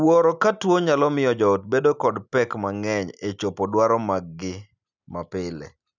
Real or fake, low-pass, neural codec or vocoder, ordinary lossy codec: real; 7.2 kHz; none; none